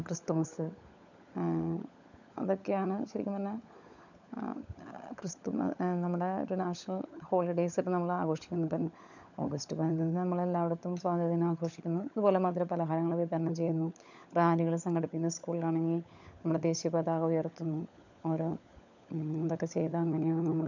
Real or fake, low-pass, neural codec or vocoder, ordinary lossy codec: fake; 7.2 kHz; codec, 16 kHz, 16 kbps, FunCodec, trained on LibriTTS, 50 frames a second; none